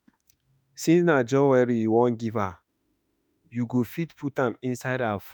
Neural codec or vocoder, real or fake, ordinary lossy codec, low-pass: autoencoder, 48 kHz, 32 numbers a frame, DAC-VAE, trained on Japanese speech; fake; none; none